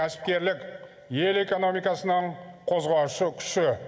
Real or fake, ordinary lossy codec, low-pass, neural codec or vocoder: real; none; none; none